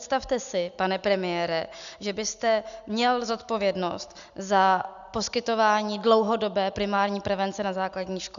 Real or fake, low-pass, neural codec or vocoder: real; 7.2 kHz; none